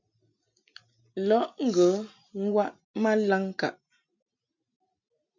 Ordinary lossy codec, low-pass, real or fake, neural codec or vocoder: MP3, 64 kbps; 7.2 kHz; real; none